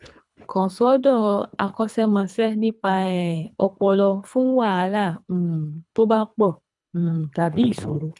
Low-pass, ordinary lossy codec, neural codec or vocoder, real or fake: 10.8 kHz; none; codec, 24 kHz, 3 kbps, HILCodec; fake